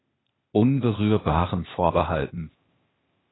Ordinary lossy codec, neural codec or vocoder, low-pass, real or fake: AAC, 16 kbps; codec, 16 kHz, 0.8 kbps, ZipCodec; 7.2 kHz; fake